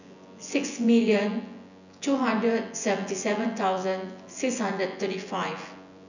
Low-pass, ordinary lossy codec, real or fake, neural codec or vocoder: 7.2 kHz; none; fake; vocoder, 24 kHz, 100 mel bands, Vocos